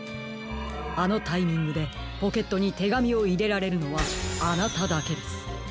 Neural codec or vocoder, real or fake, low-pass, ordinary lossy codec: none; real; none; none